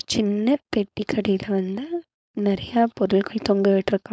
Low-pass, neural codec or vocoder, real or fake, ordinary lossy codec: none; codec, 16 kHz, 4.8 kbps, FACodec; fake; none